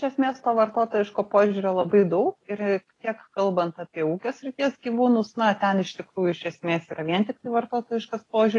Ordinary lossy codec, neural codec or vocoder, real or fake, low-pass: AAC, 32 kbps; none; real; 10.8 kHz